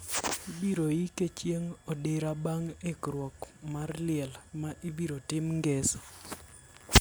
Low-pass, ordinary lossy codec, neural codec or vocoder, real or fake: none; none; none; real